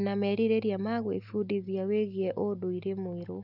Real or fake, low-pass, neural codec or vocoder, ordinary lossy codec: real; 5.4 kHz; none; none